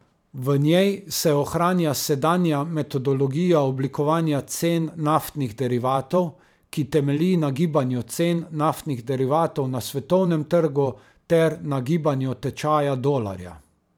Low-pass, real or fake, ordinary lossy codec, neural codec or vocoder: 19.8 kHz; fake; none; vocoder, 44.1 kHz, 128 mel bands every 512 samples, BigVGAN v2